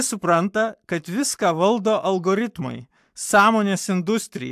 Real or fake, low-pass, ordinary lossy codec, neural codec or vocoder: fake; 14.4 kHz; AAC, 96 kbps; codec, 44.1 kHz, 7.8 kbps, Pupu-Codec